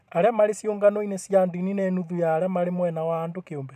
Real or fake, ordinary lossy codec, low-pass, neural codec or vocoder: fake; none; 14.4 kHz; vocoder, 44.1 kHz, 128 mel bands every 512 samples, BigVGAN v2